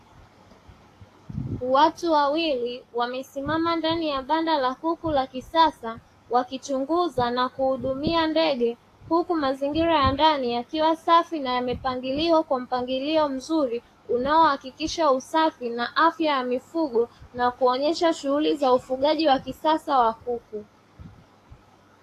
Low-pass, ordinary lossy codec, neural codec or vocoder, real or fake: 14.4 kHz; AAC, 48 kbps; codec, 44.1 kHz, 7.8 kbps, DAC; fake